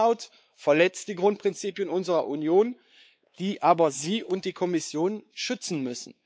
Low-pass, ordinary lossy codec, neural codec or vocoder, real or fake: none; none; codec, 16 kHz, 4 kbps, X-Codec, WavLM features, trained on Multilingual LibriSpeech; fake